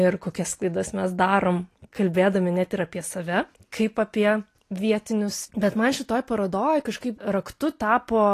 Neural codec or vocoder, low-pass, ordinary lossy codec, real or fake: none; 14.4 kHz; AAC, 48 kbps; real